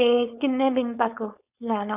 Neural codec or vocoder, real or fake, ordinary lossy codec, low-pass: codec, 16 kHz, 4.8 kbps, FACodec; fake; none; 3.6 kHz